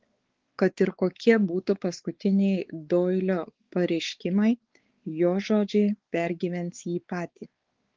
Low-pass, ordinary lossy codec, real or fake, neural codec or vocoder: 7.2 kHz; Opus, 16 kbps; fake; codec, 16 kHz, 4 kbps, X-Codec, WavLM features, trained on Multilingual LibriSpeech